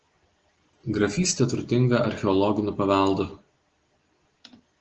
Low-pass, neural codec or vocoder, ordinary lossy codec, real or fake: 7.2 kHz; none; Opus, 16 kbps; real